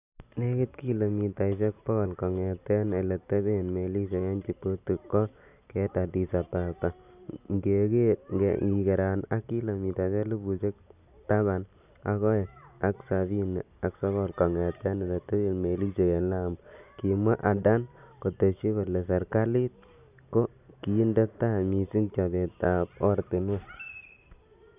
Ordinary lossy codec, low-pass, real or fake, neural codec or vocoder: none; 3.6 kHz; real; none